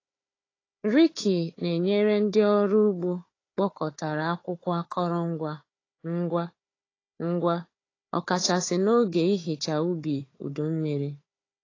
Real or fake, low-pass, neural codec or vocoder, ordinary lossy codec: fake; 7.2 kHz; codec, 16 kHz, 4 kbps, FunCodec, trained on Chinese and English, 50 frames a second; AAC, 32 kbps